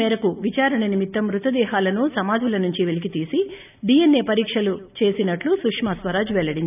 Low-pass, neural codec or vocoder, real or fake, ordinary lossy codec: 3.6 kHz; none; real; none